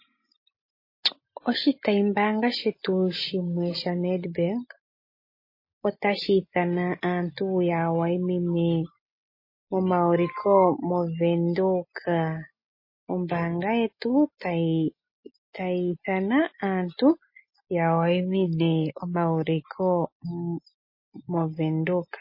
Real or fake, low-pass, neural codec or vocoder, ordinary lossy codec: real; 5.4 kHz; none; MP3, 24 kbps